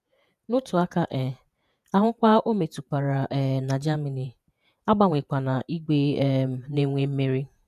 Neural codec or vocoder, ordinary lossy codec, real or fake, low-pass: vocoder, 48 kHz, 128 mel bands, Vocos; none; fake; 14.4 kHz